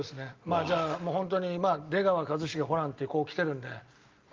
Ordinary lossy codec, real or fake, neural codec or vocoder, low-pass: Opus, 24 kbps; real; none; 7.2 kHz